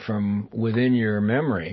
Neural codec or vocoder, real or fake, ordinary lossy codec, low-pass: none; real; MP3, 24 kbps; 7.2 kHz